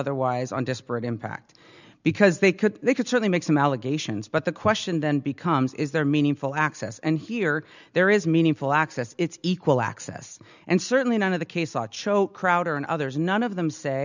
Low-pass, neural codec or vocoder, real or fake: 7.2 kHz; none; real